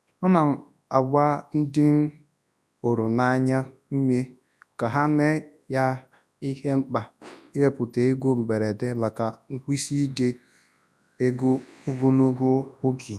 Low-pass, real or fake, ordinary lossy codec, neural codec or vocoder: none; fake; none; codec, 24 kHz, 0.9 kbps, WavTokenizer, large speech release